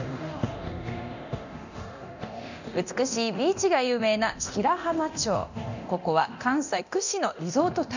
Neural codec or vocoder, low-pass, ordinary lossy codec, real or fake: codec, 24 kHz, 0.9 kbps, DualCodec; 7.2 kHz; none; fake